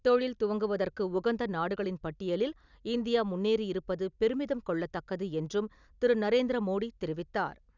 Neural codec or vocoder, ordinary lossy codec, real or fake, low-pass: none; none; real; 7.2 kHz